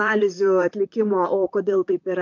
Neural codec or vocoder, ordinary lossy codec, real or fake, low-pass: vocoder, 44.1 kHz, 128 mel bands, Pupu-Vocoder; MP3, 48 kbps; fake; 7.2 kHz